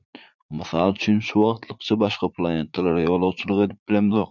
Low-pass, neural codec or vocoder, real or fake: 7.2 kHz; vocoder, 44.1 kHz, 80 mel bands, Vocos; fake